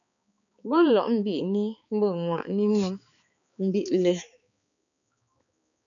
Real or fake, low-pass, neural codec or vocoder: fake; 7.2 kHz; codec, 16 kHz, 4 kbps, X-Codec, HuBERT features, trained on balanced general audio